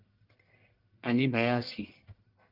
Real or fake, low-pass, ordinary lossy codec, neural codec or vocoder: fake; 5.4 kHz; Opus, 24 kbps; codec, 44.1 kHz, 1.7 kbps, Pupu-Codec